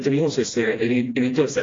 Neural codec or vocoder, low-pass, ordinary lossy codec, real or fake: codec, 16 kHz, 1 kbps, FreqCodec, smaller model; 7.2 kHz; AAC, 32 kbps; fake